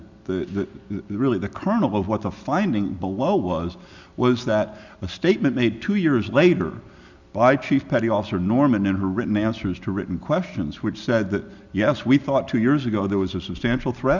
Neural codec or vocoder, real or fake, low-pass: none; real; 7.2 kHz